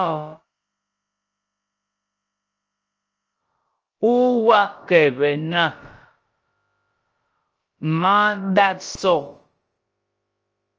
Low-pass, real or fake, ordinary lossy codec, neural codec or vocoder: 7.2 kHz; fake; Opus, 24 kbps; codec, 16 kHz, about 1 kbps, DyCAST, with the encoder's durations